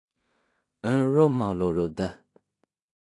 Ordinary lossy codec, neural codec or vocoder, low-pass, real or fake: AAC, 48 kbps; codec, 16 kHz in and 24 kHz out, 0.4 kbps, LongCat-Audio-Codec, two codebook decoder; 10.8 kHz; fake